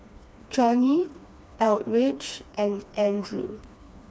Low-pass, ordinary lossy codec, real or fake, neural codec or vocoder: none; none; fake; codec, 16 kHz, 2 kbps, FreqCodec, smaller model